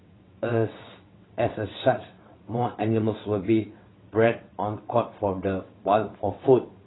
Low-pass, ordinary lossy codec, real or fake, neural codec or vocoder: 7.2 kHz; AAC, 16 kbps; fake; vocoder, 22.05 kHz, 80 mel bands, WaveNeXt